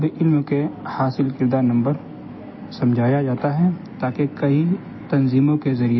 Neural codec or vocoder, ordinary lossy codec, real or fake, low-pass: none; MP3, 24 kbps; real; 7.2 kHz